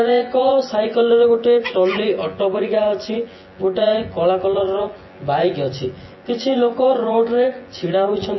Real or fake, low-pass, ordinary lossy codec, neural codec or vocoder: fake; 7.2 kHz; MP3, 24 kbps; vocoder, 24 kHz, 100 mel bands, Vocos